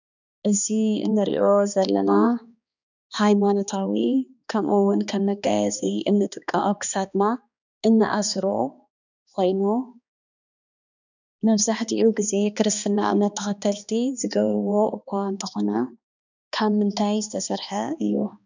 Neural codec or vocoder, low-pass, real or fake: codec, 16 kHz, 2 kbps, X-Codec, HuBERT features, trained on balanced general audio; 7.2 kHz; fake